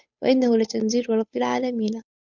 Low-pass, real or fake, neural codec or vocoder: 7.2 kHz; fake; codec, 16 kHz, 8 kbps, FunCodec, trained on Chinese and English, 25 frames a second